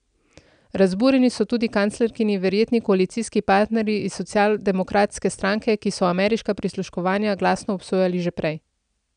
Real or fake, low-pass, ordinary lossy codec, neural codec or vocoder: real; 9.9 kHz; none; none